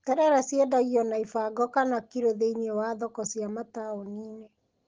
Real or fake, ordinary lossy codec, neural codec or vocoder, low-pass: real; Opus, 16 kbps; none; 7.2 kHz